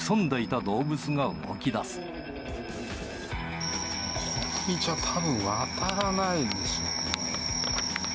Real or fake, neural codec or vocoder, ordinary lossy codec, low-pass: real; none; none; none